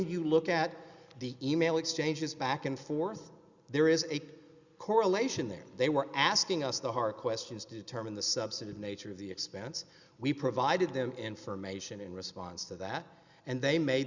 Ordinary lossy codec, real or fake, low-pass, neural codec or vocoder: Opus, 64 kbps; real; 7.2 kHz; none